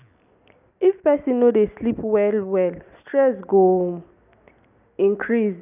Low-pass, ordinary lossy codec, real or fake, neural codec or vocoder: 3.6 kHz; none; real; none